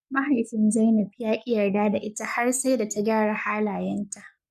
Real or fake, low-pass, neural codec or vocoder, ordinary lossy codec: fake; 14.4 kHz; codec, 44.1 kHz, 7.8 kbps, Pupu-Codec; none